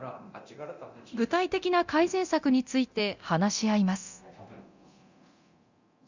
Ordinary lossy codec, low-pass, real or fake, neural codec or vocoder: Opus, 64 kbps; 7.2 kHz; fake; codec, 24 kHz, 0.9 kbps, DualCodec